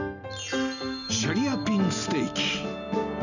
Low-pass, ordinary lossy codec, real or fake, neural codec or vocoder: 7.2 kHz; none; real; none